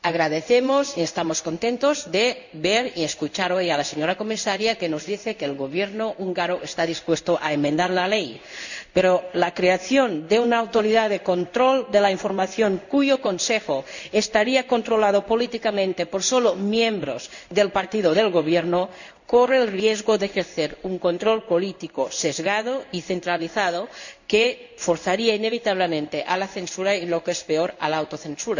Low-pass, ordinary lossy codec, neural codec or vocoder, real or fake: 7.2 kHz; none; codec, 16 kHz in and 24 kHz out, 1 kbps, XY-Tokenizer; fake